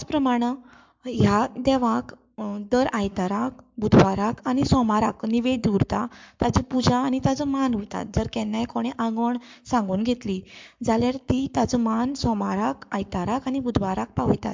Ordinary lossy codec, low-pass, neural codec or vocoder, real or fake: MP3, 64 kbps; 7.2 kHz; codec, 44.1 kHz, 7.8 kbps, Pupu-Codec; fake